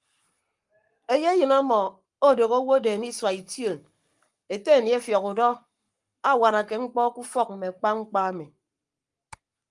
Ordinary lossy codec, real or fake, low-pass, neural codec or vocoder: Opus, 32 kbps; fake; 10.8 kHz; codec, 44.1 kHz, 7.8 kbps, Pupu-Codec